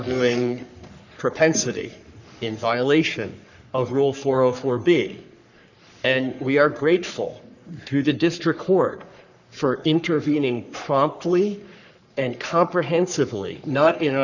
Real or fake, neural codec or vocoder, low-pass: fake; codec, 44.1 kHz, 3.4 kbps, Pupu-Codec; 7.2 kHz